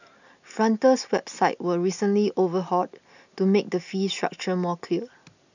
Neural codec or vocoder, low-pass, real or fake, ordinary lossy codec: none; 7.2 kHz; real; none